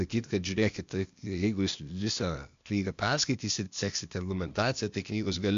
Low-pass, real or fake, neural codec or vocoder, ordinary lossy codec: 7.2 kHz; fake; codec, 16 kHz, 0.8 kbps, ZipCodec; MP3, 64 kbps